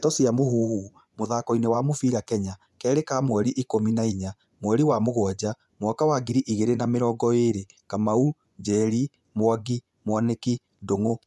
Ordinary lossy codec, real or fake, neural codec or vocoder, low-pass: none; fake; vocoder, 24 kHz, 100 mel bands, Vocos; none